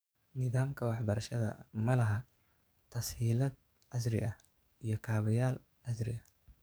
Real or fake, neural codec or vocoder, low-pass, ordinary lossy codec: fake; codec, 44.1 kHz, 7.8 kbps, DAC; none; none